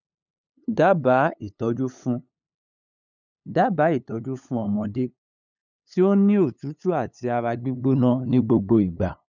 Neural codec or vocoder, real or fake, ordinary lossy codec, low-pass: codec, 16 kHz, 8 kbps, FunCodec, trained on LibriTTS, 25 frames a second; fake; none; 7.2 kHz